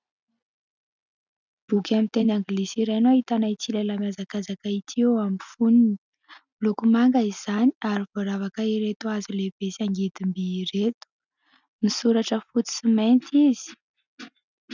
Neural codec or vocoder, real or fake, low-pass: none; real; 7.2 kHz